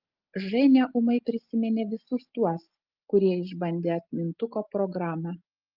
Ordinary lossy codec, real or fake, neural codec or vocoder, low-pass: Opus, 24 kbps; real; none; 5.4 kHz